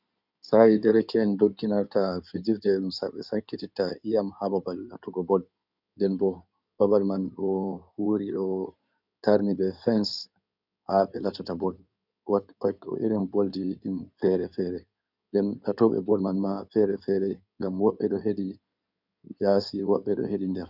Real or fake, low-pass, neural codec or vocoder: fake; 5.4 kHz; codec, 16 kHz in and 24 kHz out, 2.2 kbps, FireRedTTS-2 codec